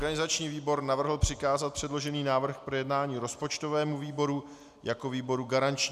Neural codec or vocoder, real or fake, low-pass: none; real; 14.4 kHz